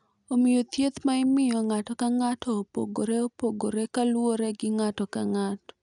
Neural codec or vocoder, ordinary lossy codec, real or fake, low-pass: none; none; real; 10.8 kHz